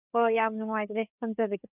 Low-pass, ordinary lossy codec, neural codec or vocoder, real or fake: 3.6 kHz; none; codec, 16 kHz, 4 kbps, FreqCodec, larger model; fake